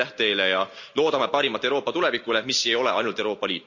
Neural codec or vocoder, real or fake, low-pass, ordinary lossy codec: none; real; 7.2 kHz; none